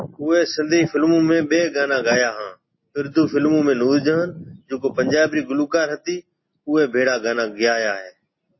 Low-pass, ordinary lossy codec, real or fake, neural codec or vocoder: 7.2 kHz; MP3, 24 kbps; real; none